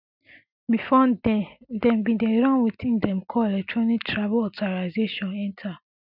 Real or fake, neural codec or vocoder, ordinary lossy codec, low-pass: real; none; none; 5.4 kHz